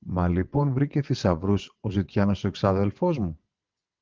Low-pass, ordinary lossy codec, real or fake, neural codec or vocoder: 7.2 kHz; Opus, 32 kbps; fake; vocoder, 22.05 kHz, 80 mel bands, WaveNeXt